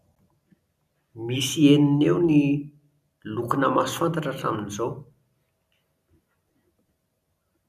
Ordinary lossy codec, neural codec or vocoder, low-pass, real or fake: none; none; 14.4 kHz; real